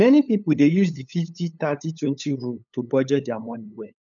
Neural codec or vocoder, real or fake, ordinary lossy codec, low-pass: codec, 16 kHz, 8 kbps, FunCodec, trained on LibriTTS, 25 frames a second; fake; none; 7.2 kHz